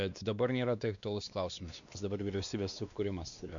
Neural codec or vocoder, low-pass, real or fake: codec, 16 kHz, 2 kbps, X-Codec, WavLM features, trained on Multilingual LibriSpeech; 7.2 kHz; fake